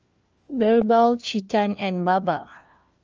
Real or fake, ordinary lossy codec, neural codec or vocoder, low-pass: fake; Opus, 24 kbps; codec, 16 kHz, 1 kbps, FunCodec, trained on LibriTTS, 50 frames a second; 7.2 kHz